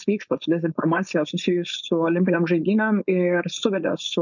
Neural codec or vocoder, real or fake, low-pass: codec, 16 kHz, 4.8 kbps, FACodec; fake; 7.2 kHz